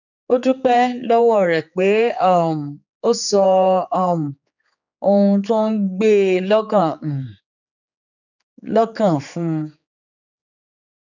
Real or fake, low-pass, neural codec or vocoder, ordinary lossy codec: fake; 7.2 kHz; codec, 16 kHz, 4 kbps, X-Codec, HuBERT features, trained on general audio; none